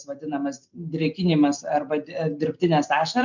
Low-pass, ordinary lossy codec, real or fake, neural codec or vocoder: 7.2 kHz; MP3, 64 kbps; real; none